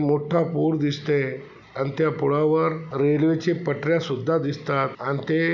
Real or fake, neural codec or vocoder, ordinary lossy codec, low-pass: real; none; none; 7.2 kHz